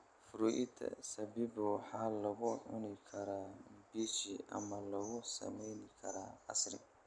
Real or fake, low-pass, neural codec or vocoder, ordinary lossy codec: real; 9.9 kHz; none; none